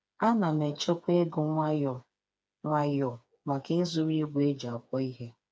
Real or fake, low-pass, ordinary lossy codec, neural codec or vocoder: fake; none; none; codec, 16 kHz, 4 kbps, FreqCodec, smaller model